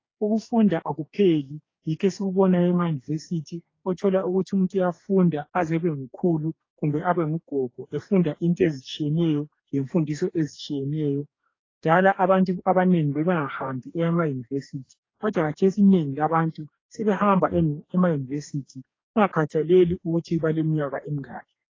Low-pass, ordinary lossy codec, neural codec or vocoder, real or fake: 7.2 kHz; AAC, 32 kbps; codec, 44.1 kHz, 2.6 kbps, DAC; fake